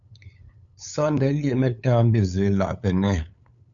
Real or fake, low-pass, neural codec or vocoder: fake; 7.2 kHz; codec, 16 kHz, 8 kbps, FunCodec, trained on LibriTTS, 25 frames a second